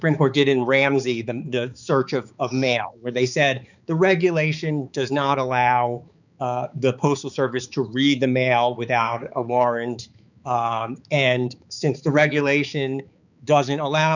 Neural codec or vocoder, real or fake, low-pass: codec, 16 kHz, 4 kbps, X-Codec, HuBERT features, trained on balanced general audio; fake; 7.2 kHz